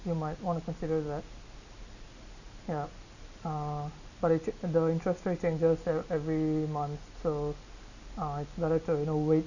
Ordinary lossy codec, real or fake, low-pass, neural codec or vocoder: none; real; 7.2 kHz; none